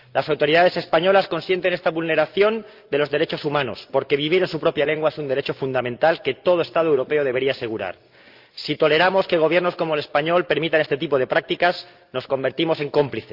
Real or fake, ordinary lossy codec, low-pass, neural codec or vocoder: real; Opus, 24 kbps; 5.4 kHz; none